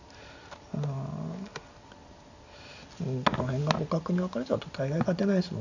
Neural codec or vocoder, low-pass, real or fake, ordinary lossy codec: none; 7.2 kHz; real; none